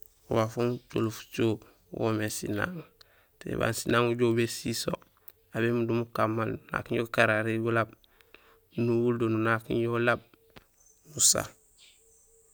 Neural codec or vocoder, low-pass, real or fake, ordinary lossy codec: none; none; real; none